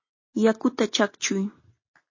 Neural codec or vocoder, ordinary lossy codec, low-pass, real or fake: none; MP3, 32 kbps; 7.2 kHz; real